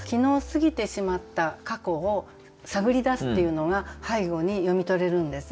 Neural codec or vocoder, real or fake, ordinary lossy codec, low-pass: none; real; none; none